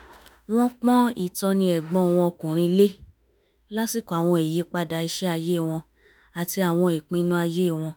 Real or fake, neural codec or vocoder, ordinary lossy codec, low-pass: fake; autoencoder, 48 kHz, 32 numbers a frame, DAC-VAE, trained on Japanese speech; none; none